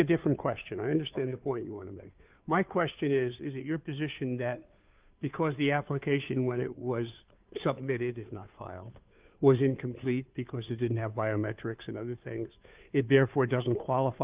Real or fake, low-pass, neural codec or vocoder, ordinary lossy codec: fake; 3.6 kHz; codec, 16 kHz, 2 kbps, FunCodec, trained on Chinese and English, 25 frames a second; Opus, 24 kbps